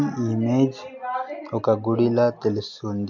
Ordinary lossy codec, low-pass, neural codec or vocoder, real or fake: MP3, 48 kbps; 7.2 kHz; none; real